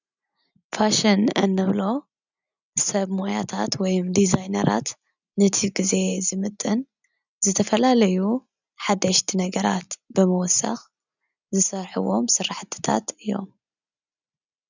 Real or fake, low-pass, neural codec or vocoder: real; 7.2 kHz; none